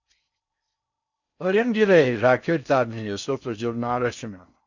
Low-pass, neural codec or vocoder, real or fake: 7.2 kHz; codec, 16 kHz in and 24 kHz out, 0.6 kbps, FocalCodec, streaming, 4096 codes; fake